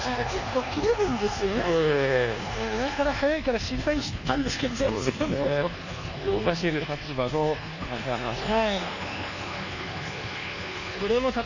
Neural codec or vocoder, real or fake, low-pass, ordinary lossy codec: codec, 24 kHz, 1.2 kbps, DualCodec; fake; 7.2 kHz; none